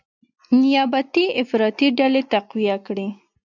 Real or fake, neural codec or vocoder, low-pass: real; none; 7.2 kHz